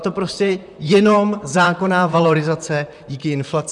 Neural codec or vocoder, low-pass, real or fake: vocoder, 44.1 kHz, 128 mel bands, Pupu-Vocoder; 10.8 kHz; fake